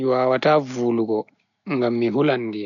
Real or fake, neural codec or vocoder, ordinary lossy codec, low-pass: real; none; none; 7.2 kHz